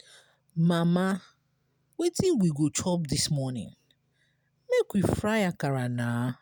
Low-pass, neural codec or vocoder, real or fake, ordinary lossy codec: none; none; real; none